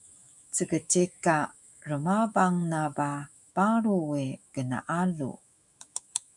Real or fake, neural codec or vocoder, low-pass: fake; autoencoder, 48 kHz, 128 numbers a frame, DAC-VAE, trained on Japanese speech; 10.8 kHz